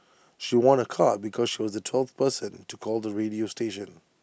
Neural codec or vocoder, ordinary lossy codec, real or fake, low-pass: none; none; real; none